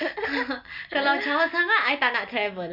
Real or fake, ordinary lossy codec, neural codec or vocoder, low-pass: real; MP3, 48 kbps; none; 5.4 kHz